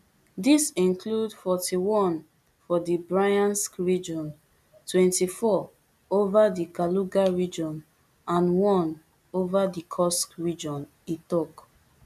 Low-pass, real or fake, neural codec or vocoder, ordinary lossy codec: 14.4 kHz; real; none; none